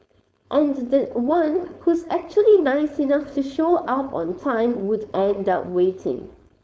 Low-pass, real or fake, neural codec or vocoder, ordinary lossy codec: none; fake; codec, 16 kHz, 4.8 kbps, FACodec; none